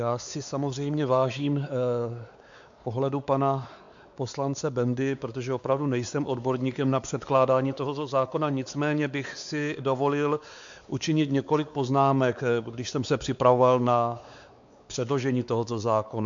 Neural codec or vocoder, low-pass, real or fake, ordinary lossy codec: codec, 16 kHz, 4 kbps, X-Codec, WavLM features, trained on Multilingual LibriSpeech; 7.2 kHz; fake; AAC, 64 kbps